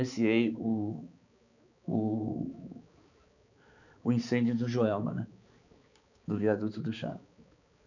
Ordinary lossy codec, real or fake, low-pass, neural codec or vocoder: none; fake; 7.2 kHz; codec, 16 kHz, 4 kbps, X-Codec, HuBERT features, trained on balanced general audio